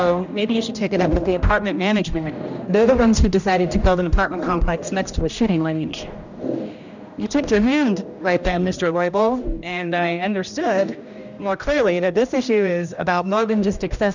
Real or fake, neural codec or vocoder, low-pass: fake; codec, 16 kHz, 1 kbps, X-Codec, HuBERT features, trained on general audio; 7.2 kHz